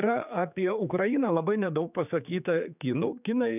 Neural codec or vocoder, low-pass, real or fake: codec, 16 kHz, 4 kbps, X-Codec, HuBERT features, trained on general audio; 3.6 kHz; fake